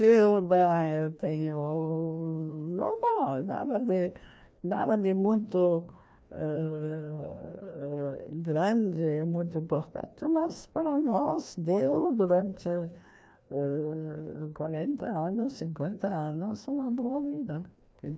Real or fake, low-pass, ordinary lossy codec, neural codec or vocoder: fake; none; none; codec, 16 kHz, 1 kbps, FreqCodec, larger model